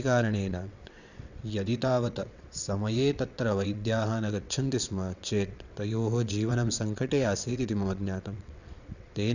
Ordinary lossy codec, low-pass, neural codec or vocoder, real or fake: none; 7.2 kHz; vocoder, 22.05 kHz, 80 mel bands, WaveNeXt; fake